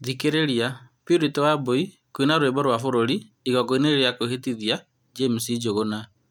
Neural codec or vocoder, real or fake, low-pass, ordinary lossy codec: none; real; 19.8 kHz; none